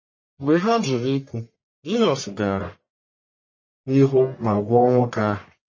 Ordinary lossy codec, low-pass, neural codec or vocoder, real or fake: MP3, 32 kbps; 7.2 kHz; codec, 44.1 kHz, 1.7 kbps, Pupu-Codec; fake